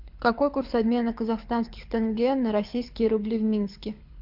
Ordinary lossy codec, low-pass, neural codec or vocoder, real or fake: MP3, 48 kbps; 5.4 kHz; codec, 24 kHz, 6 kbps, HILCodec; fake